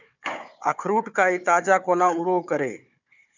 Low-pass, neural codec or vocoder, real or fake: 7.2 kHz; codec, 16 kHz, 4 kbps, FunCodec, trained on Chinese and English, 50 frames a second; fake